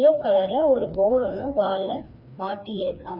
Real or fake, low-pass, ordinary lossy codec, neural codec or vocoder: fake; 5.4 kHz; none; codec, 16 kHz, 2 kbps, FreqCodec, larger model